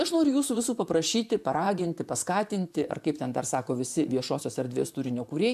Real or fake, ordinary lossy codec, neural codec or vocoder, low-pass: fake; AAC, 96 kbps; vocoder, 44.1 kHz, 128 mel bands, Pupu-Vocoder; 14.4 kHz